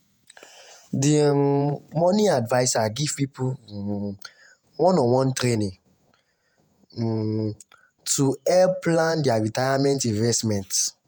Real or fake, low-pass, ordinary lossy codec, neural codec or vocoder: fake; none; none; vocoder, 48 kHz, 128 mel bands, Vocos